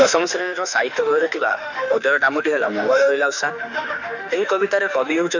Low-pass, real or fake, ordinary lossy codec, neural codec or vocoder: 7.2 kHz; fake; none; autoencoder, 48 kHz, 32 numbers a frame, DAC-VAE, trained on Japanese speech